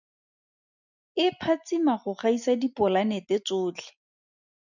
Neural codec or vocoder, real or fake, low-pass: none; real; 7.2 kHz